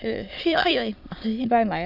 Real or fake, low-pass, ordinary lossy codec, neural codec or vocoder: fake; 5.4 kHz; none; autoencoder, 22.05 kHz, a latent of 192 numbers a frame, VITS, trained on many speakers